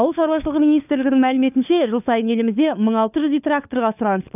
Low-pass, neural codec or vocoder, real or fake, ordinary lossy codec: 3.6 kHz; codec, 16 kHz, 4 kbps, FunCodec, trained on LibriTTS, 50 frames a second; fake; none